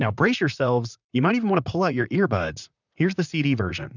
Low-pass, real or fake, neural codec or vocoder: 7.2 kHz; fake; vocoder, 44.1 kHz, 128 mel bands, Pupu-Vocoder